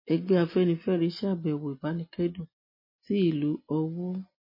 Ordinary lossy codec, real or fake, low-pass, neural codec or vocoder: MP3, 24 kbps; real; 5.4 kHz; none